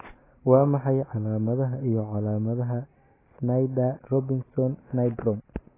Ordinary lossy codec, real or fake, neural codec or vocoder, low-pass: AAC, 16 kbps; real; none; 3.6 kHz